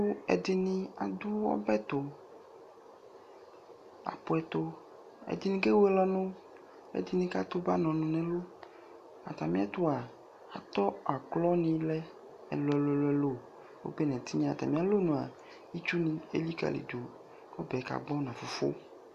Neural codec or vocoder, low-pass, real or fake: none; 14.4 kHz; real